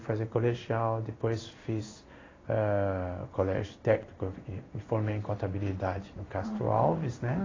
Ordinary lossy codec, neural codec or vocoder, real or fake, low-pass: AAC, 32 kbps; codec, 16 kHz in and 24 kHz out, 1 kbps, XY-Tokenizer; fake; 7.2 kHz